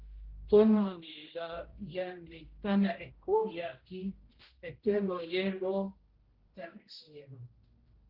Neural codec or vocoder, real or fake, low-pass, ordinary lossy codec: codec, 16 kHz, 0.5 kbps, X-Codec, HuBERT features, trained on general audio; fake; 5.4 kHz; Opus, 16 kbps